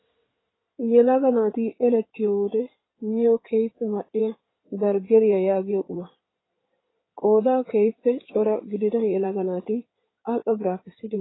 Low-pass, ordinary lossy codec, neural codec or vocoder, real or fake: 7.2 kHz; AAC, 16 kbps; codec, 16 kHz in and 24 kHz out, 2.2 kbps, FireRedTTS-2 codec; fake